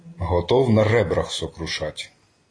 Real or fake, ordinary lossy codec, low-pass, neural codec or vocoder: real; AAC, 32 kbps; 9.9 kHz; none